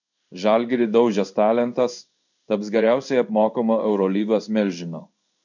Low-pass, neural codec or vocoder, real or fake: 7.2 kHz; codec, 16 kHz in and 24 kHz out, 1 kbps, XY-Tokenizer; fake